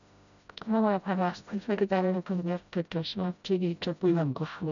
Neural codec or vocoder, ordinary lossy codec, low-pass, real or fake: codec, 16 kHz, 0.5 kbps, FreqCodec, smaller model; none; 7.2 kHz; fake